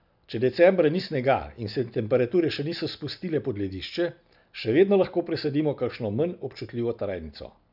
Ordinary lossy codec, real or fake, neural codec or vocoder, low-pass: none; real; none; 5.4 kHz